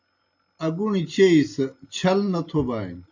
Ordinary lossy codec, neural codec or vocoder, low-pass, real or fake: AAC, 48 kbps; none; 7.2 kHz; real